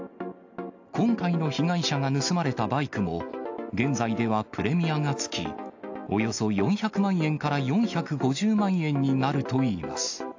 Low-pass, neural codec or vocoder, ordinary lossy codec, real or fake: 7.2 kHz; none; AAC, 48 kbps; real